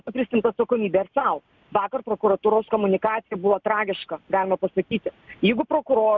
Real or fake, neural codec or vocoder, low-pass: real; none; 7.2 kHz